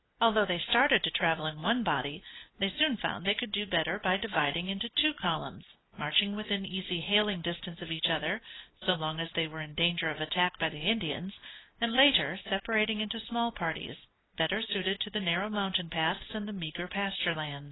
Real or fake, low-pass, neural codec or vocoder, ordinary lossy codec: real; 7.2 kHz; none; AAC, 16 kbps